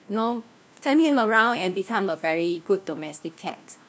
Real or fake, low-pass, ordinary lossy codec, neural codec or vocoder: fake; none; none; codec, 16 kHz, 1 kbps, FunCodec, trained on LibriTTS, 50 frames a second